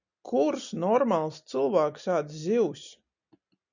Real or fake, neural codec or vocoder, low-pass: real; none; 7.2 kHz